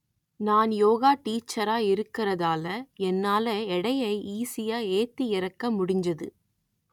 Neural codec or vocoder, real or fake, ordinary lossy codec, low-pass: none; real; none; 19.8 kHz